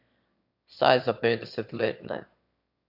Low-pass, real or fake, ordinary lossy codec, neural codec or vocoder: 5.4 kHz; fake; none; autoencoder, 22.05 kHz, a latent of 192 numbers a frame, VITS, trained on one speaker